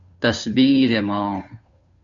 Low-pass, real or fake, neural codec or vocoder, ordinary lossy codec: 7.2 kHz; fake; codec, 16 kHz, 2 kbps, FunCodec, trained on Chinese and English, 25 frames a second; AAC, 64 kbps